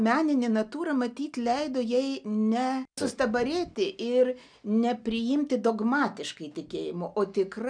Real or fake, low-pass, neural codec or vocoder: real; 9.9 kHz; none